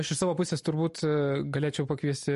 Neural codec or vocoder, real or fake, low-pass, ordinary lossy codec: none; real; 14.4 kHz; MP3, 48 kbps